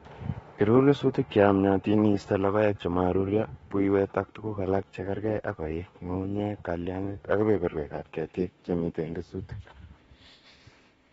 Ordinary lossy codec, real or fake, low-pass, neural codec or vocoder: AAC, 24 kbps; fake; 19.8 kHz; autoencoder, 48 kHz, 32 numbers a frame, DAC-VAE, trained on Japanese speech